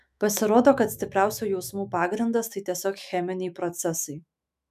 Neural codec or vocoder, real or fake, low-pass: autoencoder, 48 kHz, 128 numbers a frame, DAC-VAE, trained on Japanese speech; fake; 14.4 kHz